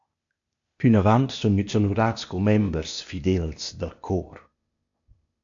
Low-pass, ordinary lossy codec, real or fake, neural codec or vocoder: 7.2 kHz; AAC, 64 kbps; fake; codec, 16 kHz, 0.8 kbps, ZipCodec